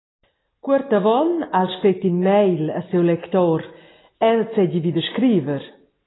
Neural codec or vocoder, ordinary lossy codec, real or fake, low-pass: none; AAC, 16 kbps; real; 7.2 kHz